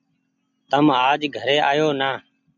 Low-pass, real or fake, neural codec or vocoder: 7.2 kHz; real; none